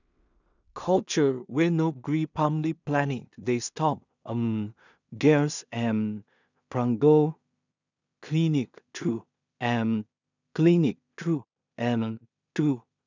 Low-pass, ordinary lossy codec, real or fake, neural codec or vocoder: 7.2 kHz; none; fake; codec, 16 kHz in and 24 kHz out, 0.4 kbps, LongCat-Audio-Codec, two codebook decoder